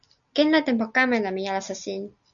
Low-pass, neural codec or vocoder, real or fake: 7.2 kHz; none; real